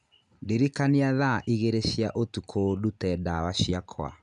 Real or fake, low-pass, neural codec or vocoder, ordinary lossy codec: real; 9.9 kHz; none; none